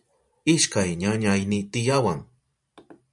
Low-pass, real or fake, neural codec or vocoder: 10.8 kHz; fake; vocoder, 44.1 kHz, 128 mel bands every 512 samples, BigVGAN v2